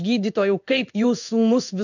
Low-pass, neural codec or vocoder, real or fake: 7.2 kHz; codec, 16 kHz in and 24 kHz out, 1 kbps, XY-Tokenizer; fake